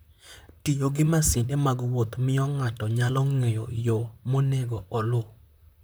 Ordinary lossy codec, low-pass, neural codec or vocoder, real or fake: none; none; vocoder, 44.1 kHz, 128 mel bands, Pupu-Vocoder; fake